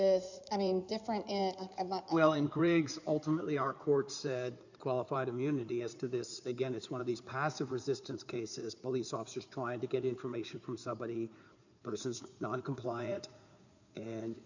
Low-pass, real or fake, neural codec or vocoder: 7.2 kHz; fake; codec, 16 kHz in and 24 kHz out, 2.2 kbps, FireRedTTS-2 codec